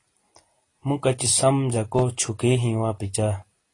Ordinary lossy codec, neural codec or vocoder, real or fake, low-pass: AAC, 32 kbps; none; real; 10.8 kHz